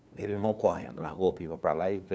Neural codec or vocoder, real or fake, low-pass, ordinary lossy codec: codec, 16 kHz, 2 kbps, FunCodec, trained on LibriTTS, 25 frames a second; fake; none; none